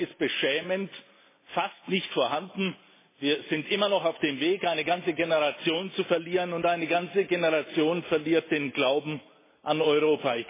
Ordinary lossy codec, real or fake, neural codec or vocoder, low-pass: MP3, 16 kbps; real; none; 3.6 kHz